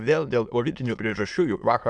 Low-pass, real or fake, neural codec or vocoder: 9.9 kHz; fake; autoencoder, 22.05 kHz, a latent of 192 numbers a frame, VITS, trained on many speakers